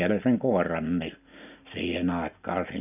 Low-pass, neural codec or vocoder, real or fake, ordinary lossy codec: 3.6 kHz; none; real; none